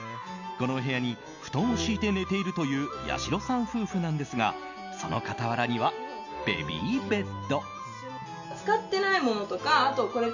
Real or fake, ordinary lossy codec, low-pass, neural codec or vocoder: real; none; 7.2 kHz; none